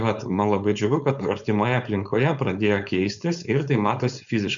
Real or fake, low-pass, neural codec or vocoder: fake; 7.2 kHz; codec, 16 kHz, 4.8 kbps, FACodec